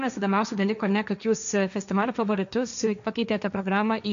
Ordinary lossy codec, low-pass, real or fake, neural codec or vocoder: AAC, 96 kbps; 7.2 kHz; fake; codec, 16 kHz, 1.1 kbps, Voila-Tokenizer